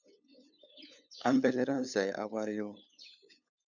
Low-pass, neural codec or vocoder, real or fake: 7.2 kHz; codec, 16 kHz, 8 kbps, FunCodec, trained on LibriTTS, 25 frames a second; fake